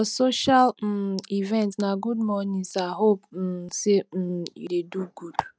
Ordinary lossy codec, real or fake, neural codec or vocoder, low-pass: none; real; none; none